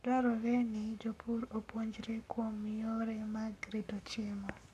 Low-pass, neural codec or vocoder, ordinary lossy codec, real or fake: 14.4 kHz; codec, 44.1 kHz, 7.8 kbps, Pupu-Codec; none; fake